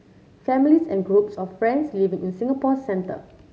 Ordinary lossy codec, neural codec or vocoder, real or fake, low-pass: none; none; real; none